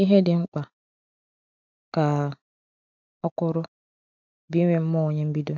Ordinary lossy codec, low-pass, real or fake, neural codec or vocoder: none; 7.2 kHz; real; none